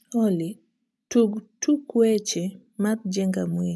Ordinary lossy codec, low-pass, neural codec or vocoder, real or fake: none; none; none; real